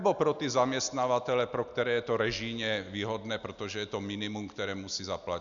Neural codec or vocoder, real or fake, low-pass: none; real; 7.2 kHz